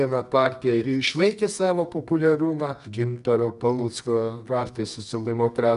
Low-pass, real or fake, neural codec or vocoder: 10.8 kHz; fake; codec, 24 kHz, 0.9 kbps, WavTokenizer, medium music audio release